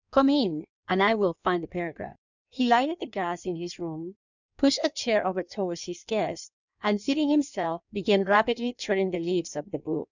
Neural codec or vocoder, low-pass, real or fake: codec, 16 kHz in and 24 kHz out, 1.1 kbps, FireRedTTS-2 codec; 7.2 kHz; fake